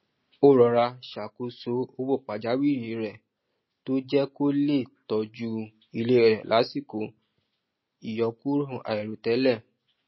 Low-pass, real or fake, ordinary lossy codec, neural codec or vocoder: 7.2 kHz; real; MP3, 24 kbps; none